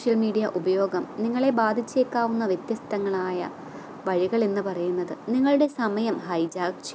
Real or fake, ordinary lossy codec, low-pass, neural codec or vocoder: real; none; none; none